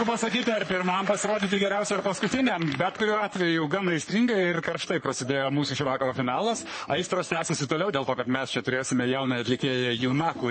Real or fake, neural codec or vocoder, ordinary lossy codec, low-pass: fake; codec, 44.1 kHz, 3.4 kbps, Pupu-Codec; MP3, 32 kbps; 9.9 kHz